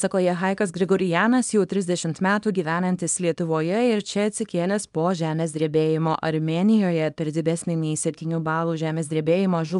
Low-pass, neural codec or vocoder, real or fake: 10.8 kHz; codec, 24 kHz, 0.9 kbps, WavTokenizer, small release; fake